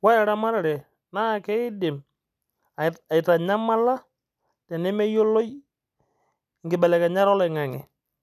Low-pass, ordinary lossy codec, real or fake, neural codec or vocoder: 14.4 kHz; none; real; none